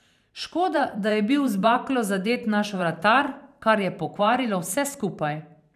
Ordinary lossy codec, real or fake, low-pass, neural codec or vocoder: none; fake; 14.4 kHz; vocoder, 44.1 kHz, 128 mel bands every 512 samples, BigVGAN v2